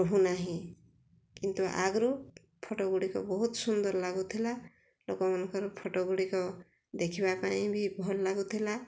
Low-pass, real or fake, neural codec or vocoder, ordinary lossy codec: none; real; none; none